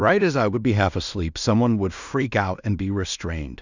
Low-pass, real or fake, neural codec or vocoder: 7.2 kHz; fake; codec, 16 kHz in and 24 kHz out, 0.4 kbps, LongCat-Audio-Codec, two codebook decoder